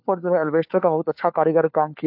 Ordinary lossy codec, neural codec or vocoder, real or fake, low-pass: AAC, 48 kbps; codec, 16 kHz, 4 kbps, FunCodec, trained on LibriTTS, 50 frames a second; fake; 5.4 kHz